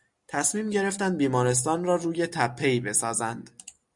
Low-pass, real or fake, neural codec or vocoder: 10.8 kHz; real; none